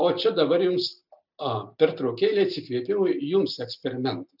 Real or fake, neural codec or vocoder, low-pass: real; none; 5.4 kHz